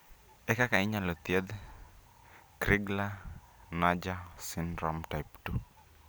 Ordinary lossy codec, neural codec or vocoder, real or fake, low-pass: none; none; real; none